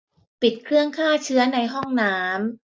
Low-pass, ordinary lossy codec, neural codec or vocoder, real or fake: none; none; none; real